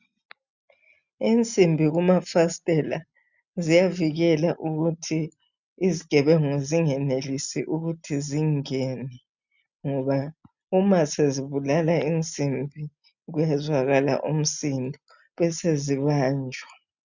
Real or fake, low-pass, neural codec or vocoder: real; 7.2 kHz; none